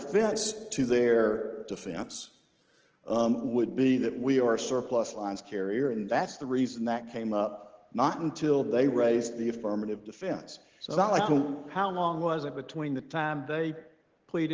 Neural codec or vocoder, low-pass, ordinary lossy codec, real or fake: none; 7.2 kHz; Opus, 16 kbps; real